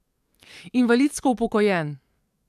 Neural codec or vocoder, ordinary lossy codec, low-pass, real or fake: codec, 44.1 kHz, 7.8 kbps, DAC; none; 14.4 kHz; fake